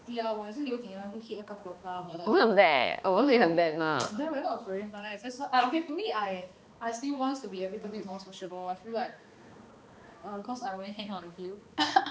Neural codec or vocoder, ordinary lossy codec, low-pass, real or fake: codec, 16 kHz, 2 kbps, X-Codec, HuBERT features, trained on balanced general audio; none; none; fake